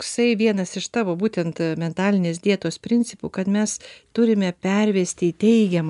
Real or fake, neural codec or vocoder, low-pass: real; none; 10.8 kHz